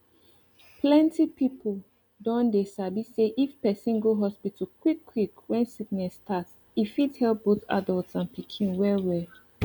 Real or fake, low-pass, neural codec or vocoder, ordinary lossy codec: real; 19.8 kHz; none; none